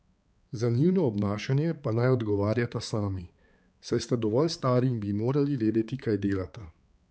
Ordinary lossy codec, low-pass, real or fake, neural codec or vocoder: none; none; fake; codec, 16 kHz, 4 kbps, X-Codec, HuBERT features, trained on balanced general audio